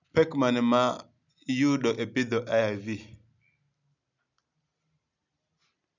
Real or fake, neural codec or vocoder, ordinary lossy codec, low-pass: real; none; none; 7.2 kHz